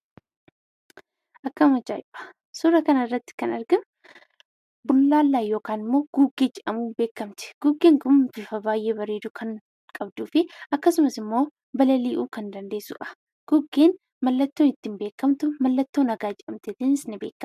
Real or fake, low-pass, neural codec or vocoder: real; 14.4 kHz; none